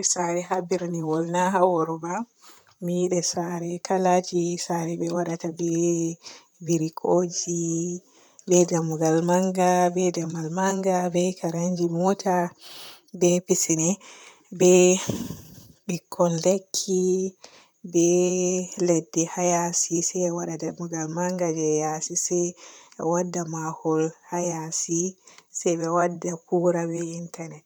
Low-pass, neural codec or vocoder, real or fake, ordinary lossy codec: none; vocoder, 44.1 kHz, 128 mel bands, Pupu-Vocoder; fake; none